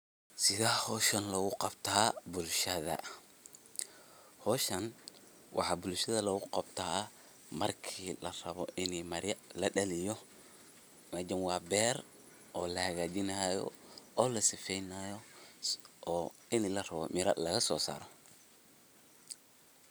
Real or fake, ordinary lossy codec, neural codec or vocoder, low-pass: real; none; none; none